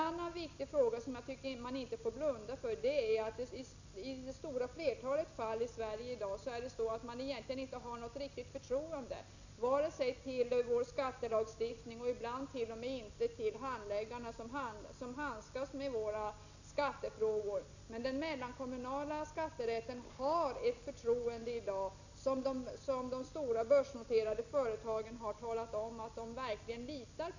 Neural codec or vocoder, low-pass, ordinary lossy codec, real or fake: none; 7.2 kHz; none; real